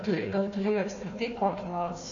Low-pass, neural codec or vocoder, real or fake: 7.2 kHz; codec, 16 kHz, 1 kbps, FunCodec, trained on Chinese and English, 50 frames a second; fake